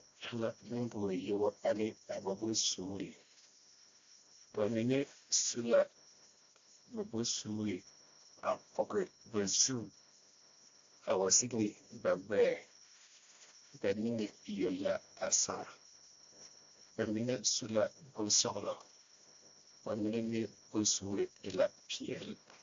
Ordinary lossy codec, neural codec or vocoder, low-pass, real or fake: AAC, 48 kbps; codec, 16 kHz, 1 kbps, FreqCodec, smaller model; 7.2 kHz; fake